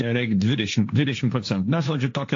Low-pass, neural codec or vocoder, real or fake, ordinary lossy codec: 7.2 kHz; codec, 16 kHz, 1.1 kbps, Voila-Tokenizer; fake; AAC, 48 kbps